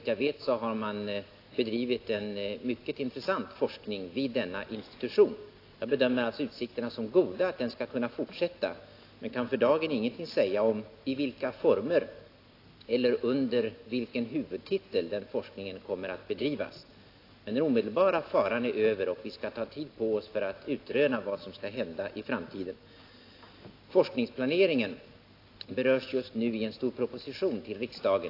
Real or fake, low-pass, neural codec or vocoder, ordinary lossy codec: real; 5.4 kHz; none; AAC, 32 kbps